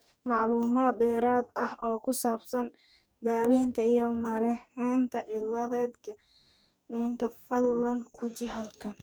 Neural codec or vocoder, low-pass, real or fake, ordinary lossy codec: codec, 44.1 kHz, 2.6 kbps, DAC; none; fake; none